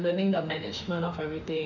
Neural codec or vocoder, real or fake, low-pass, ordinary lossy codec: autoencoder, 48 kHz, 32 numbers a frame, DAC-VAE, trained on Japanese speech; fake; 7.2 kHz; none